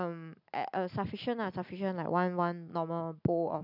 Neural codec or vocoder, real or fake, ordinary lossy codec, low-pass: none; real; none; 5.4 kHz